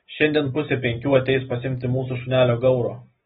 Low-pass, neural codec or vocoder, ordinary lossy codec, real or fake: 19.8 kHz; none; AAC, 16 kbps; real